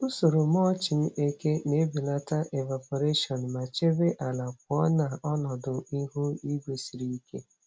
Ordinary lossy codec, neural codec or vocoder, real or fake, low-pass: none; none; real; none